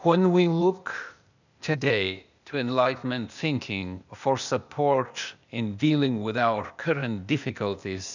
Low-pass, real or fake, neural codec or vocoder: 7.2 kHz; fake; codec, 16 kHz, 0.8 kbps, ZipCodec